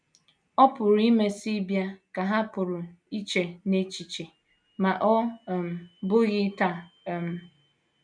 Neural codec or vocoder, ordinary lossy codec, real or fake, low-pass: none; none; real; 9.9 kHz